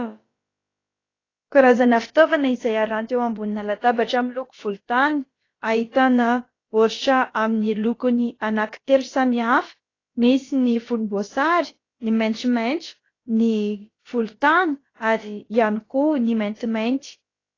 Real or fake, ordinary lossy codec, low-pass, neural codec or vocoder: fake; AAC, 32 kbps; 7.2 kHz; codec, 16 kHz, about 1 kbps, DyCAST, with the encoder's durations